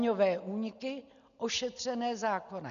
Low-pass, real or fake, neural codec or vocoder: 7.2 kHz; real; none